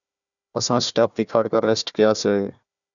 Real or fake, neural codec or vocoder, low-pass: fake; codec, 16 kHz, 1 kbps, FunCodec, trained on Chinese and English, 50 frames a second; 7.2 kHz